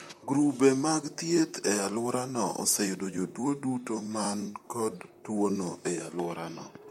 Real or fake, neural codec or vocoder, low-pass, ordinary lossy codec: fake; vocoder, 44.1 kHz, 128 mel bands, Pupu-Vocoder; 19.8 kHz; MP3, 64 kbps